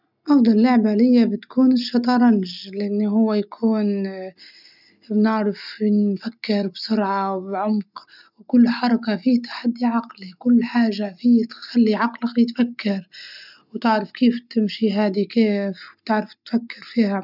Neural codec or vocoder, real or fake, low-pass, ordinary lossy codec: none; real; 5.4 kHz; none